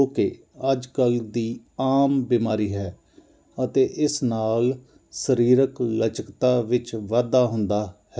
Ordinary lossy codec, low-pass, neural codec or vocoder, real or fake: none; none; none; real